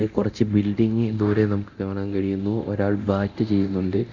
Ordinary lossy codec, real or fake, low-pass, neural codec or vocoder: none; fake; 7.2 kHz; codec, 24 kHz, 0.9 kbps, DualCodec